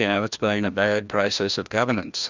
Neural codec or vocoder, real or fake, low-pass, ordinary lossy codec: codec, 16 kHz, 1 kbps, FreqCodec, larger model; fake; 7.2 kHz; Opus, 64 kbps